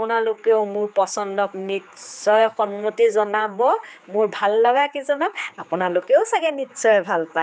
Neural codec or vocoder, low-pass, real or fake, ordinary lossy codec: codec, 16 kHz, 4 kbps, X-Codec, HuBERT features, trained on general audio; none; fake; none